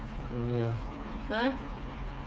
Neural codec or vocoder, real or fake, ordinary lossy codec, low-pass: codec, 16 kHz, 4 kbps, FreqCodec, smaller model; fake; none; none